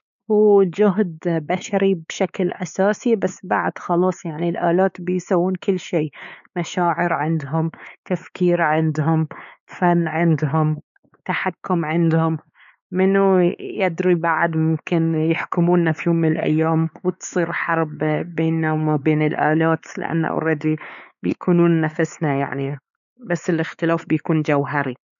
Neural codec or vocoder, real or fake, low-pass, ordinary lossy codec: codec, 16 kHz, 4 kbps, X-Codec, WavLM features, trained on Multilingual LibriSpeech; fake; 7.2 kHz; none